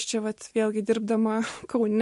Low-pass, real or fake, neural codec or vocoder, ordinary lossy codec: 14.4 kHz; real; none; MP3, 48 kbps